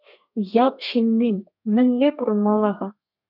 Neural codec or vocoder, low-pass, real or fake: codec, 32 kHz, 1.9 kbps, SNAC; 5.4 kHz; fake